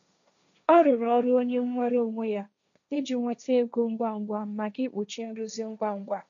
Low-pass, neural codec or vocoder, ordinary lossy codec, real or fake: 7.2 kHz; codec, 16 kHz, 1.1 kbps, Voila-Tokenizer; none; fake